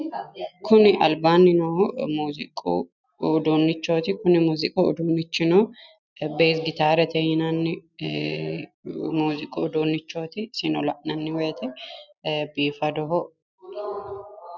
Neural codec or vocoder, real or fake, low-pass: none; real; 7.2 kHz